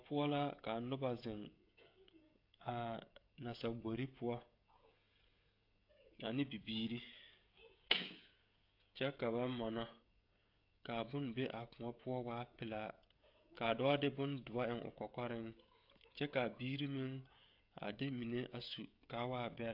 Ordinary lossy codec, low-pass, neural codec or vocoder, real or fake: AAC, 48 kbps; 5.4 kHz; codec, 16 kHz, 16 kbps, FreqCodec, smaller model; fake